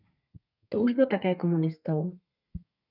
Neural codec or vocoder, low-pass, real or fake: codec, 32 kHz, 1.9 kbps, SNAC; 5.4 kHz; fake